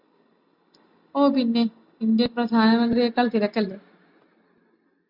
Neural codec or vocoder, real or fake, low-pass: none; real; 5.4 kHz